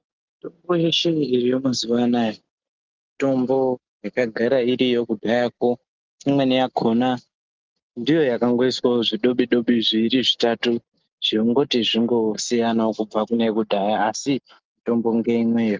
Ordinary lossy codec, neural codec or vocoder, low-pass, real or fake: Opus, 32 kbps; none; 7.2 kHz; real